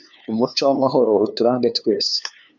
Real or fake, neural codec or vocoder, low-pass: fake; codec, 16 kHz, 2 kbps, FunCodec, trained on LibriTTS, 25 frames a second; 7.2 kHz